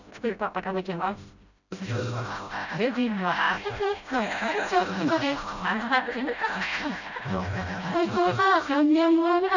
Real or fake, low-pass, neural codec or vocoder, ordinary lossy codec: fake; 7.2 kHz; codec, 16 kHz, 0.5 kbps, FreqCodec, smaller model; none